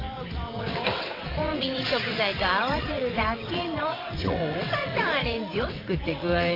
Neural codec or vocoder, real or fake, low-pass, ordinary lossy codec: vocoder, 22.05 kHz, 80 mel bands, WaveNeXt; fake; 5.4 kHz; AAC, 24 kbps